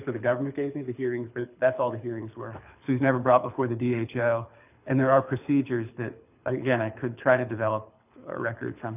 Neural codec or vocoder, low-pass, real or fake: codec, 24 kHz, 6 kbps, HILCodec; 3.6 kHz; fake